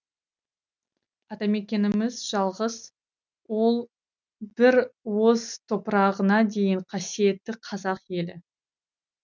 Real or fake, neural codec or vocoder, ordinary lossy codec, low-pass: real; none; none; 7.2 kHz